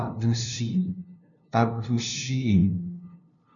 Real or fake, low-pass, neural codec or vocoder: fake; 7.2 kHz; codec, 16 kHz, 0.5 kbps, FunCodec, trained on LibriTTS, 25 frames a second